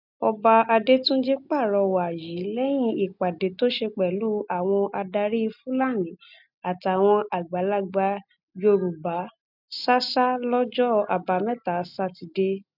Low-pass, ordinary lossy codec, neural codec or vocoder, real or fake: 5.4 kHz; none; none; real